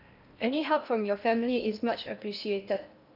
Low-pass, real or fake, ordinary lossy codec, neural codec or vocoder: 5.4 kHz; fake; none; codec, 16 kHz in and 24 kHz out, 0.8 kbps, FocalCodec, streaming, 65536 codes